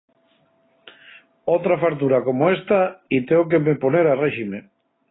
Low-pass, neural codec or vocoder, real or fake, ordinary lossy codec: 7.2 kHz; none; real; AAC, 16 kbps